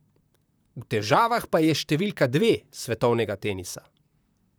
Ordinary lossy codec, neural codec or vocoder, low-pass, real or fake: none; vocoder, 44.1 kHz, 128 mel bands, Pupu-Vocoder; none; fake